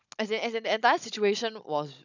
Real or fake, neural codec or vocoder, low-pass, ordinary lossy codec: fake; codec, 16 kHz, 16 kbps, FunCodec, trained on Chinese and English, 50 frames a second; 7.2 kHz; none